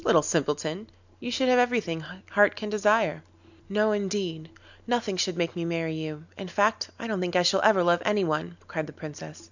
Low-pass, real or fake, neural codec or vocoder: 7.2 kHz; real; none